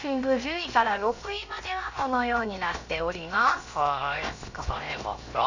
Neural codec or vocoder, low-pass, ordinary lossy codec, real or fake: codec, 16 kHz, 0.7 kbps, FocalCodec; 7.2 kHz; Opus, 64 kbps; fake